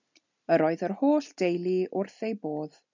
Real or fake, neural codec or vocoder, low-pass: real; none; 7.2 kHz